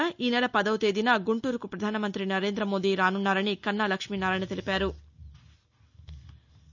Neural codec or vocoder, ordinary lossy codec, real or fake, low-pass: none; none; real; 7.2 kHz